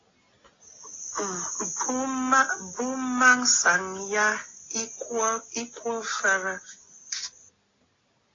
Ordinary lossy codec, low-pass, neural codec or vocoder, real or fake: AAC, 32 kbps; 7.2 kHz; none; real